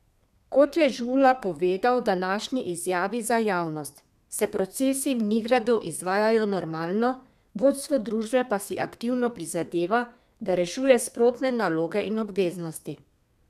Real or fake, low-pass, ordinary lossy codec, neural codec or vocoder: fake; 14.4 kHz; none; codec, 32 kHz, 1.9 kbps, SNAC